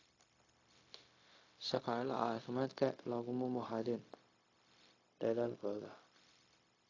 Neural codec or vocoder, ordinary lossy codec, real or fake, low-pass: codec, 16 kHz, 0.4 kbps, LongCat-Audio-Codec; AAC, 32 kbps; fake; 7.2 kHz